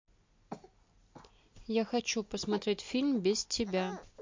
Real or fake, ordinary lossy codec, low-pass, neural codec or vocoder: real; MP3, 48 kbps; 7.2 kHz; none